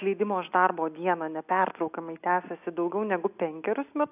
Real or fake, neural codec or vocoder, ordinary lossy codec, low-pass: real; none; AAC, 32 kbps; 3.6 kHz